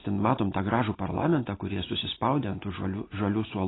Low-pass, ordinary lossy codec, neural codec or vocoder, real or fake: 7.2 kHz; AAC, 16 kbps; none; real